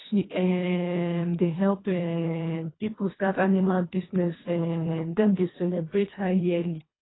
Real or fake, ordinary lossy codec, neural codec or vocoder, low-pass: fake; AAC, 16 kbps; codec, 24 kHz, 1.5 kbps, HILCodec; 7.2 kHz